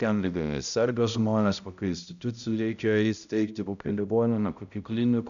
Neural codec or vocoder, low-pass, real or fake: codec, 16 kHz, 0.5 kbps, X-Codec, HuBERT features, trained on balanced general audio; 7.2 kHz; fake